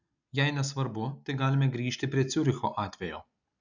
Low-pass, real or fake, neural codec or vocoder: 7.2 kHz; real; none